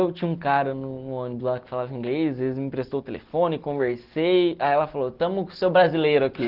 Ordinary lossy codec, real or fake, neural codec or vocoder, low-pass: Opus, 16 kbps; real; none; 5.4 kHz